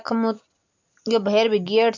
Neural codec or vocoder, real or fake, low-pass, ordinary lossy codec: none; real; 7.2 kHz; MP3, 48 kbps